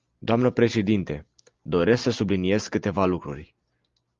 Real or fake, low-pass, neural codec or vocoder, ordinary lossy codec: real; 7.2 kHz; none; Opus, 32 kbps